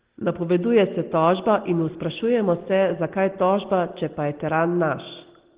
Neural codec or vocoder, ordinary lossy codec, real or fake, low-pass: none; Opus, 16 kbps; real; 3.6 kHz